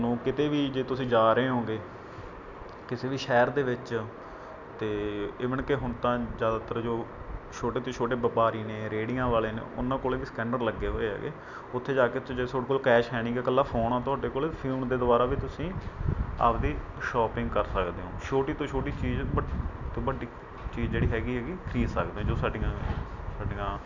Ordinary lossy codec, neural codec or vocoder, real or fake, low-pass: none; none; real; 7.2 kHz